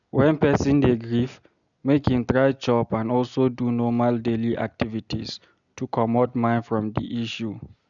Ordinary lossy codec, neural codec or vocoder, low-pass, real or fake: none; none; 7.2 kHz; real